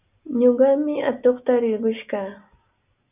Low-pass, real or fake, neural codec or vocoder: 3.6 kHz; real; none